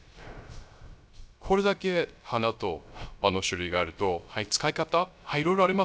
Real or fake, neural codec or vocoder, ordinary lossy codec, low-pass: fake; codec, 16 kHz, 0.3 kbps, FocalCodec; none; none